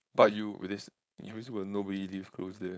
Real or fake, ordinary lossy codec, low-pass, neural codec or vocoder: fake; none; none; codec, 16 kHz, 4.8 kbps, FACodec